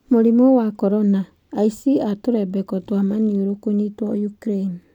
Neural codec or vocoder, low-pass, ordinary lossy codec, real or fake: none; 19.8 kHz; none; real